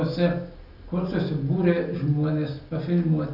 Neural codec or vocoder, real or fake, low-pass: none; real; 5.4 kHz